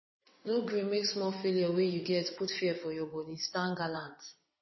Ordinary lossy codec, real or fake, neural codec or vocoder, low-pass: MP3, 24 kbps; fake; vocoder, 22.05 kHz, 80 mel bands, WaveNeXt; 7.2 kHz